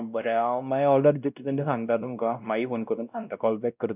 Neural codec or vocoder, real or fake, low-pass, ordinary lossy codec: codec, 16 kHz, 1 kbps, X-Codec, WavLM features, trained on Multilingual LibriSpeech; fake; 3.6 kHz; none